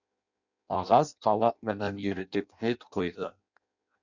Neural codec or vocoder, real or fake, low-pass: codec, 16 kHz in and 24 kHz out, 0.6 kbps, FireRedTTS-2 codec; fake; 7.2 kHz